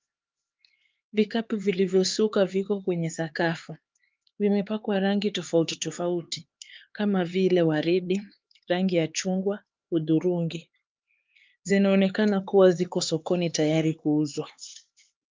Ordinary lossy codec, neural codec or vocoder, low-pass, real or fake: Opus, 32 kbps; codec, 16 kHz, 4 kbps, X-Codec, HuBERT features, trained on LibriSpeech; 7.2 kHz; fake